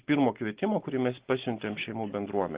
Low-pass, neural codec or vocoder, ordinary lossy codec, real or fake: 3.6 kHz; none; Opus, 16 kbps; real